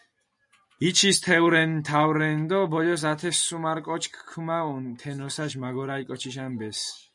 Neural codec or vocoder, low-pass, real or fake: none; 10.8 kHz; real